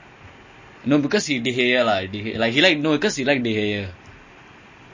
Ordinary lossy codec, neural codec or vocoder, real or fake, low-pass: MP3, 32 kbps; none; real; 7.2 kHz